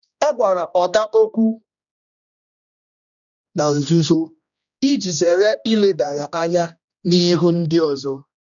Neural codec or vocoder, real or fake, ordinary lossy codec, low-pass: codec, 16 kHz, 1 kbps, X-Codec, HuBERT features, trained on general audio; fake; none; 7.2 kHz